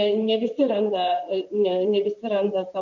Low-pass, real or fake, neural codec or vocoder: 7.2 kHz; fake; vocoder, 44.1 kHz, 128 mel bands, Pupu-Vocoder